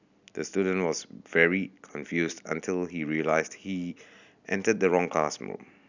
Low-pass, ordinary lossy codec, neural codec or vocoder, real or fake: 7.2 kHz; none; none; real